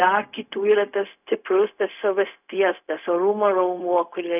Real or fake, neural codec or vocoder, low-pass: fake; codec, 16 kHz, 0.4 kbps, LongCat-Audio-Codec; 3.6 kHz